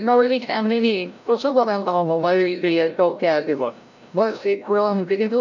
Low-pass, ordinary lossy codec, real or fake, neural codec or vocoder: 7.2 kHz; none; fake; codec, 16 kHz, 0.5 kbps, FreqCodec, larger model